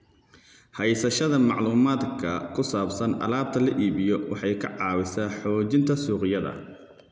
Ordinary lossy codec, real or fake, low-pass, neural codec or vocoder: none; real; none; none